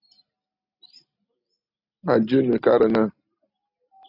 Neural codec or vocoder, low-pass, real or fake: none; 5.4 kHz; real